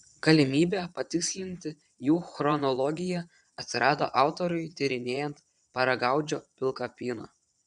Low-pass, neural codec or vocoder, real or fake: 9.9 kHz; vocoder, 22.05 kHz, 80 mel bands, Vocos; fake